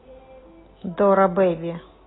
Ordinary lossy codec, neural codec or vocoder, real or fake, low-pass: AAC, 16 kbps; none; real; 7.2 kHz